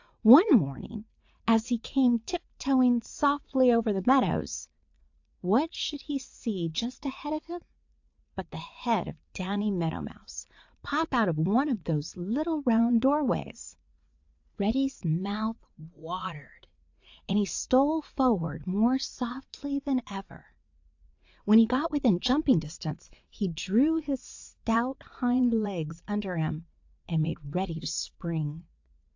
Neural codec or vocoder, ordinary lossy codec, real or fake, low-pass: vocoder, 44.1 kHz, 80 mel bands, Vocos; AAC, 48 kbps; fake; 7.2 kHz